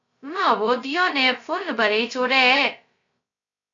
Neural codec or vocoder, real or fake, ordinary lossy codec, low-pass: codec, 16 kHz, 0.2 kbps, FocalCodec; fake; AAC, 64 kbps; 7.2 kHz